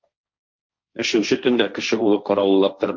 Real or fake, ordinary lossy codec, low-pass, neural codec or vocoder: fake; MP3, 48 kbps; 7.2 kHz; codec, 16 kHz, 1.1 kbps, Voila-Tokenizer